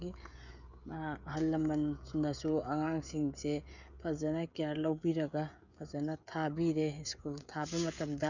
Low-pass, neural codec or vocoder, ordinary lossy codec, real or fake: 7.2 kHz; codec, 16 kHz, 16 kbps, FreqCodec, smaller model; none; fake